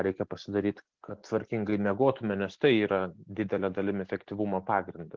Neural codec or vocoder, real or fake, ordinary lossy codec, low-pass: none; real; Opus, 16 kbps; 7.2 kHz